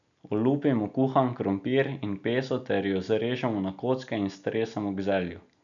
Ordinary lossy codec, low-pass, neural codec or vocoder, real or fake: none; 7.2 kHz; none; real